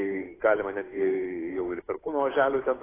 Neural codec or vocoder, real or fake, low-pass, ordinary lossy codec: codec, 16 kHz, 8 kbps, FunCodec, trained on Chinese and English, 25 frames a second; fake; 3.6 kHz; AAC, 16 kbps